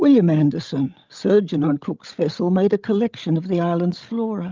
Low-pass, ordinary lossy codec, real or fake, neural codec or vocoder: 7.2 kHz; Opus, 32 kbps; fake; codec, 16 kHz, 16 kbps, FreqCodec, larger model